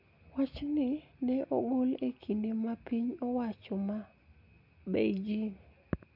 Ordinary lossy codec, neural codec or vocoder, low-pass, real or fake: none; none; 5.4 kHz; real